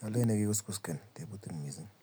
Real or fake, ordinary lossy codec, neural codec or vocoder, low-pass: real; none; none; none